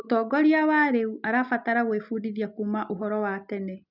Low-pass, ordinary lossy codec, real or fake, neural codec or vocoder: 5.4 kHz; none; real; none